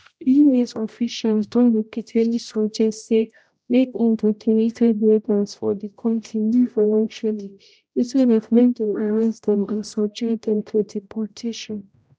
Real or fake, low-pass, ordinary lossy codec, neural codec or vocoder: fake; none; none; codec, 16 kHz, 0.5 kbps, X-Codec, HuBERT features, trained on general audio